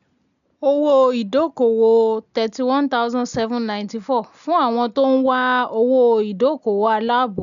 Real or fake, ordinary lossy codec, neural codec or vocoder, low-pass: real; none; none; 7.2 kHz